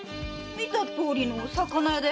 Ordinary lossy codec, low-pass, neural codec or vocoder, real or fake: none; none; none; real